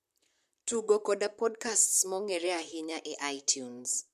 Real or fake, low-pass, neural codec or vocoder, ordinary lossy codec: fake; 14.4 kHz; vocoder, 44.1 kHz, 128 mel bands, Pupu-Vocoder; MP3, 96 kbps